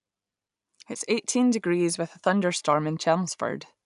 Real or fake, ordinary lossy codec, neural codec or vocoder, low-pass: real; none; none; 10.8 kHz